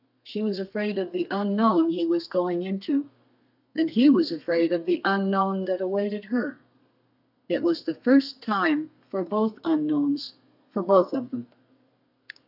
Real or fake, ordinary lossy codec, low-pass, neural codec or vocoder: fake; AAC, 48 kbps; 5.4 kHz; codec, 32 kHz, 1.9 kbps, SNAC